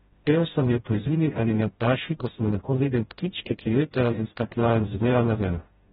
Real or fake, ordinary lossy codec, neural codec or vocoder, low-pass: fake; AAC, 16 kbps; codec, 16 kHz, 0.5 kbps, FreqCodec, smaller model; 7.2 kHz